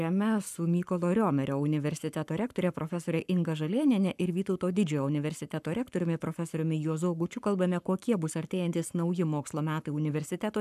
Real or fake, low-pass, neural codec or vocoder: fake; 14.4 kHz; codec, 44.1 kHz, 7.8 kbps, Pupu-Codec